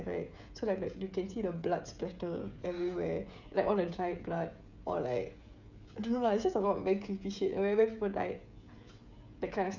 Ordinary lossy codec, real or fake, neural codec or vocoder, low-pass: none; fake; codec, 16 kHz, 16 kbps, FreqCodec, smaller model; 7.2 kHz